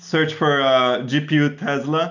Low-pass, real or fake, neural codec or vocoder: 7.2 kHz; real; none